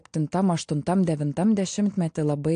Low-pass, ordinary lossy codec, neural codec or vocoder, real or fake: 9.9 kHz; AAC, 64 kbps; none; real